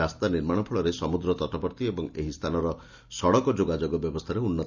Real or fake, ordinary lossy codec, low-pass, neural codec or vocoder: real; none; 7.2 kHz; none